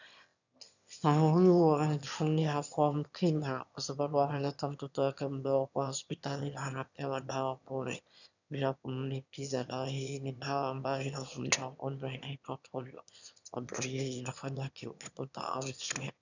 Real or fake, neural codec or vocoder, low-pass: fake; autoencoder, 22.05 kHz, a latent of 192 numbers a frame, VITS, trained on one speaker; 7.2 kHz